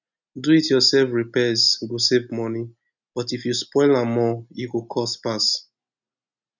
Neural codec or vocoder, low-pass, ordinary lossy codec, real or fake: none; 7.2 kHz; none; real